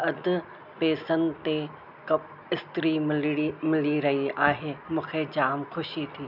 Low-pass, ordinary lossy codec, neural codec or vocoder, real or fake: 5.4 kHz; none; none; real